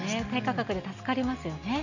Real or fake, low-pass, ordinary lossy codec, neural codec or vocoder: real; 7.2 kHz; none; none